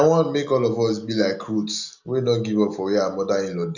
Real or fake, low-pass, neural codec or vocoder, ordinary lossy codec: real; 7.2 kHz; none; none